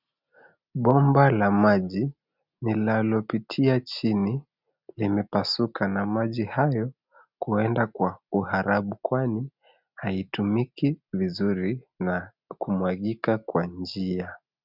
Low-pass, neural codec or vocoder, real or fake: 5.4 kHz; none; real